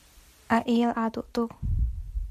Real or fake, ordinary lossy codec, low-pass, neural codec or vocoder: real; MP3, 64 kbps; 14.4 kHz; none